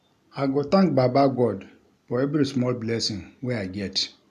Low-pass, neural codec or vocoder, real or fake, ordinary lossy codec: 14.4 kHz; none; real; none